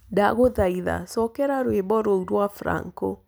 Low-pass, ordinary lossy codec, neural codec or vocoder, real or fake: none; none; none; real